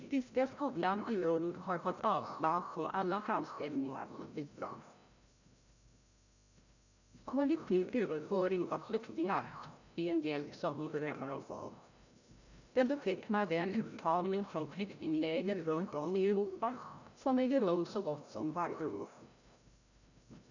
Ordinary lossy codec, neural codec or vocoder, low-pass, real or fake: none; codec, 16 kHz, 0.5 kbps, FreqCodec, larger model; 7.2 kHz; fake